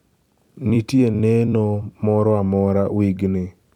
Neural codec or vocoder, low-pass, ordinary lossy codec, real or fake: vocoder, 44.1 kHz, 128 mel bands every 256 samples, BigVGAN v2; 19.8 kHz; none; fake